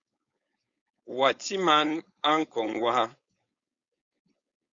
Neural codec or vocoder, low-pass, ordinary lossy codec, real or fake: codec, 16 kHz, 4.8 kbps, FACodec; 7.2 kHz; Opus, 64 kbps; fake